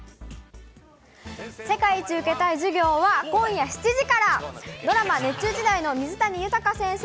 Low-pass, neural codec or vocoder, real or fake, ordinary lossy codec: none; none; real; none